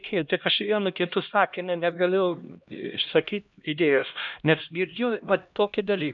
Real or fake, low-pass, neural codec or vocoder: fake; 7.2 kHz; codec, 16 kHz, 1 kbps, X-Codec, HuBERT features, trained on LibriSpeech